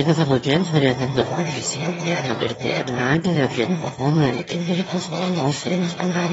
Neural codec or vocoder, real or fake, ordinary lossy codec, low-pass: autoencoder, 22.05 kHz, a latent of 192 numbers a frame, VITS, trained on one speaker; fake; AAC, 24 kbps; 9.9 kHz